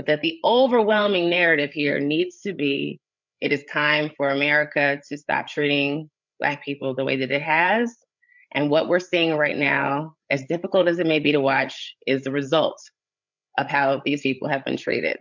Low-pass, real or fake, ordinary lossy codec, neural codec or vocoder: 7.2 kHz; fake; MP3, 64 kbps; codec, 16 kHz, 8 kbps, FreqCodec, larger model